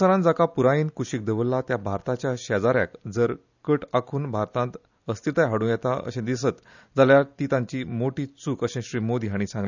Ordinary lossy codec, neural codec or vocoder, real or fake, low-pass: none; none; real; 7.2 kHz